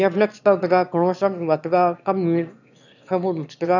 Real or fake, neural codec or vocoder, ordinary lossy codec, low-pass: fake; autoencoder, 22.05 kHz, a latent of 192 numbers a frame, VITS, trained on one speaker; none; 7.2 kHz